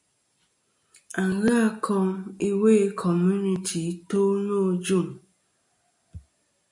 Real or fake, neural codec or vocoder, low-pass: real; none; 10.8 kHz